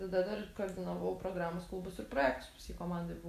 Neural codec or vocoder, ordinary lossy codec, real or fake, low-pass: none; AAC, 64 kbps; real; 14.4 kHz